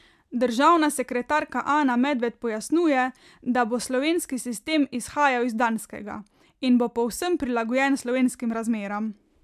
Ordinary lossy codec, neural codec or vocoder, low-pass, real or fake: MP3, 96 kbps; none; 14.4 kHz; real